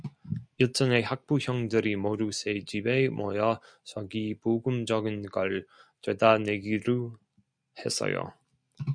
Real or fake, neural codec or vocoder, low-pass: real; none; 9.9 kHz